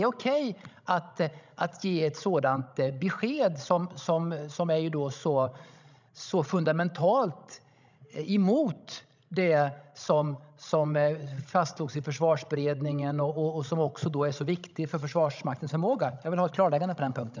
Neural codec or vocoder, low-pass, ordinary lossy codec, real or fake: codec, 16 kHz, 16 kbps, FreqCodec, larger model; 7.2 kHz; none; fake